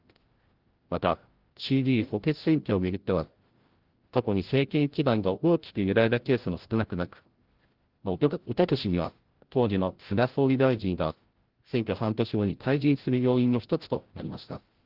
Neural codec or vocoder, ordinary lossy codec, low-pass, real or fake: codec, 16 kHz, 0.5 kbps, FreqCodec, larger model; Opus, 16 kbps; 5.4 kHz; fake